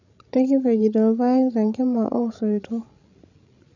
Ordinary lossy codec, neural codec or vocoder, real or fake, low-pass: none; codec, 16 kHz, 16 kbps, FreqCodec, larger model; fake; 7.2 kHz